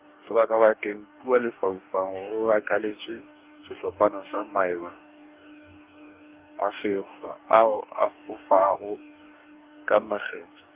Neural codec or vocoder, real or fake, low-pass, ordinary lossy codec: codec, 44.1 kHz, 2.6 kbps, DAC; fake; 3.6 kHz; Opus, 32 kbps